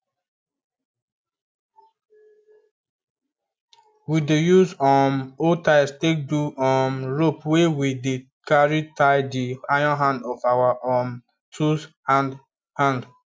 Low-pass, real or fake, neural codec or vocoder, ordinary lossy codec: none; real; none; none